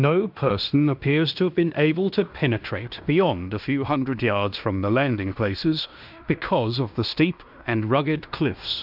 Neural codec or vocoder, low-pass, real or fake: codec, 16 kHz in and 24 kHz out, 0.9 kbps, LongCat-Audio-Codec, fine tuned four codebook decoder; 5.4 kHz; fake